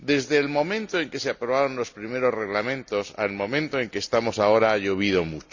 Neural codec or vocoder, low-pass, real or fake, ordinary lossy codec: none; 7.2 kHz; real; Opus, 64 kbps